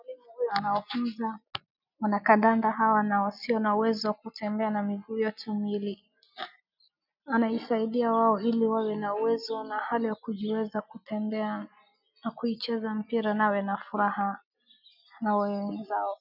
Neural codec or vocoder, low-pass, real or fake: none; 5.4 kHz; real